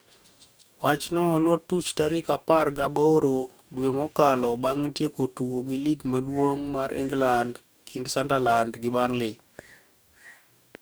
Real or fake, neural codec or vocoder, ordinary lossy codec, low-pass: fake; codec, 44.1 kHz, 2.6 kbps, DAC; none; none